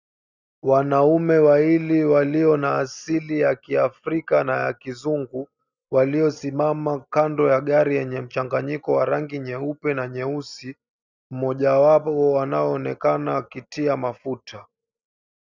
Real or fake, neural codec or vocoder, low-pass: real; none; 7.2 kHz